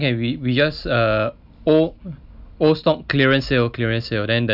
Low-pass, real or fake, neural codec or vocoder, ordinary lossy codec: 5.4 kHz; real; none; none